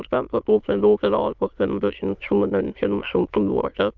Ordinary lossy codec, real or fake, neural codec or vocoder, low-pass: Opus, 24 kbps; fake; autoencoder, 22.05 kHz, a latent of 192 numbers a frame, VITS, trained on many speakers; 7.2 kHz